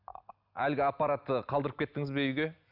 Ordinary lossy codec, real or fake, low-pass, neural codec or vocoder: none; real; 5.4 kHz; none